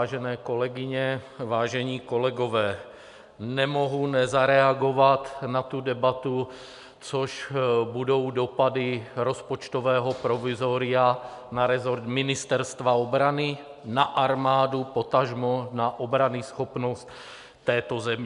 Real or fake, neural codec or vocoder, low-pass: real; none; 10.8 kHz